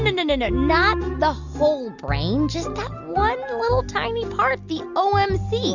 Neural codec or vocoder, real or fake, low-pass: none; real; 7.2 kHz